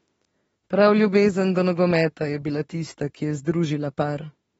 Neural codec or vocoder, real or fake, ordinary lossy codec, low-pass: autoencoder, 48 kHz, 32 numbers a frame, DAC-VAE, trained on Japanese speech; fake; AAC, 24 kbps; 19.8 kHz